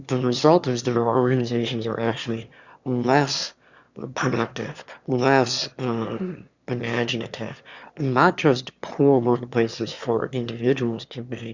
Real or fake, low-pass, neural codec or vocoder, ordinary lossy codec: fake; 7.2 kHz; autoencoder, 22.05 kHz, a latent of 192 numbers a frame, VITS, trained on one speaker; Opus, 64 kbps